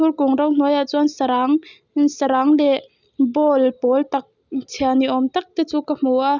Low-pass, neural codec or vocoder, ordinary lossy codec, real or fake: 7.2 kHz; none; none; real